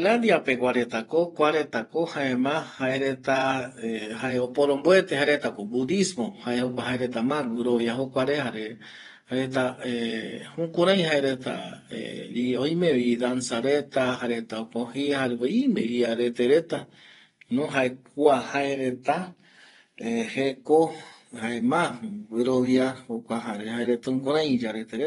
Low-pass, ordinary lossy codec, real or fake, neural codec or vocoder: 10.8 kHz; AAC, 32 kbps; fake; vocoder, 24 kHz, 100 mel bands, Vocos